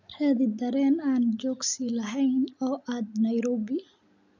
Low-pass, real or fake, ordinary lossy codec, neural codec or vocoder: 7.2 kHz; real; none; none